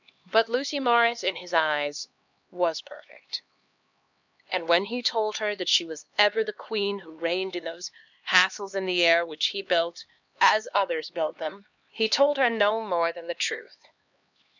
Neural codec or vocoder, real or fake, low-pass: codec, 16 kHz, 2 kbps, X-Codec, HuBERT features, trained on LibriSpeech; fake; 7.2 kHz